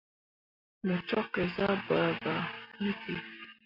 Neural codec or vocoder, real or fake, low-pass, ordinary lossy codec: none; real; 5.4 kHz; AAC, 32 kbps